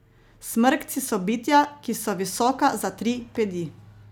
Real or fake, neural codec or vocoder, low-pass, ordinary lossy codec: real; none; none; none